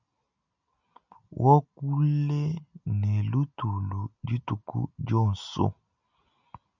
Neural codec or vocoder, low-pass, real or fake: none; 7.2 kHz; real